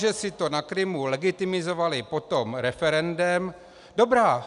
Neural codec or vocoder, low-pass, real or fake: none; 10.8 kHz; real